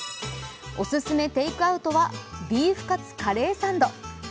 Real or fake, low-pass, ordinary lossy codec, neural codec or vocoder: real; none; none; none